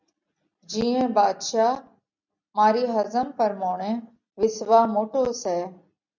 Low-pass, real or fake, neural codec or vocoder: 7.2 kHz; real; none